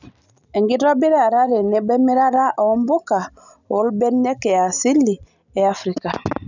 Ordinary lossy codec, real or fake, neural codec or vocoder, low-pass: none; real; none; 7.2 kHz